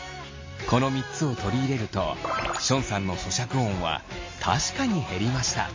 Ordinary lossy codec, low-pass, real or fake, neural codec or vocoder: MP3, 32 kbps; 7.2 kHz; real; none